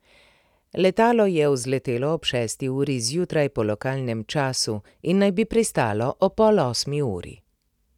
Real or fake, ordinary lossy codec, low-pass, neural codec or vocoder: real; none; 19.8 kHz; none